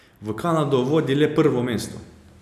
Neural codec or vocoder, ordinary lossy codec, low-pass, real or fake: none; none; 14.4 kHz; real